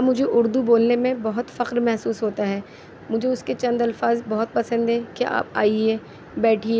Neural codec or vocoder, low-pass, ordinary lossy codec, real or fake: none; none; none; real